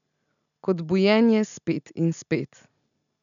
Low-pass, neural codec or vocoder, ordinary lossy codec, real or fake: 7.2 kHz; none; none; real